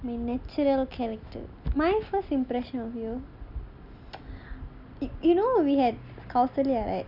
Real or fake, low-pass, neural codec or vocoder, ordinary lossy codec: real; 5.4 kHz; none; none